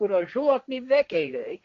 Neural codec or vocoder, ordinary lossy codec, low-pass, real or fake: codec, 16 kHz, 1.1 kbps, Voila-Tokenizer; none; 7.2 kHz; fake